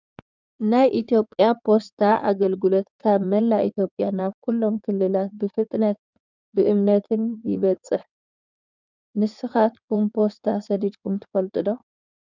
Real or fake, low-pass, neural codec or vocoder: fake; 7.2 kHz; codec, 16 kHz in and 24 kHz out, 2.2 kbps, FireRedTTS-2 codec